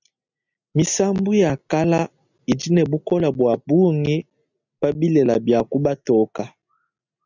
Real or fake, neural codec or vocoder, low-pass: real; none; 7.2 kHz